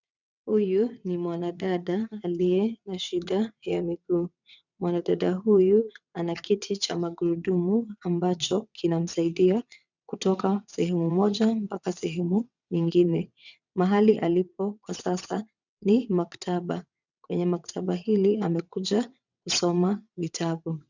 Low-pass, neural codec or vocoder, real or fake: 7.2 kHz; vocoder, 22.05 kHz, 80 mel bands, WaveNeXt; fake